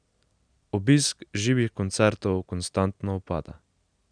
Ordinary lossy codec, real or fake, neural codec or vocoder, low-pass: none; real; none; 9.9 kHz